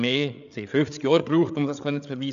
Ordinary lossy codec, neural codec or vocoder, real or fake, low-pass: none; codec, 16 kHz, 8 kbps, FreqCodec, larger model; fake; 7.2 kHz